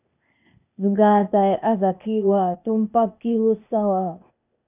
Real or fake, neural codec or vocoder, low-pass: fake; codec, 16 kHz, 0.8 kbps, ZipCodec; 3.6 kHz